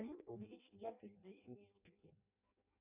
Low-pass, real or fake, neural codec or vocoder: 3.6 kHz; fake; codec, 16 kHz in and 24 kHz out, 0.6 kbps, FireRedTTS-2 codec